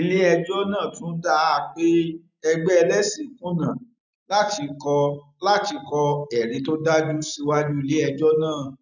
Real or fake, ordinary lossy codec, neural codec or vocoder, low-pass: real; none; none; 7.2 kHz